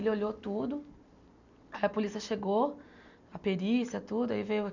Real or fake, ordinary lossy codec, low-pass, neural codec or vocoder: real; none; 7.2 kHz; none